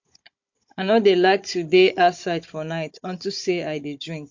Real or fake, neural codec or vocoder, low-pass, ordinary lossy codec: fake; codec, 16 kHz, 16 kbps, FunCodec, trained on Chinese and English, 50 frames a second; 7.2 kHz; MP3, 48 kbps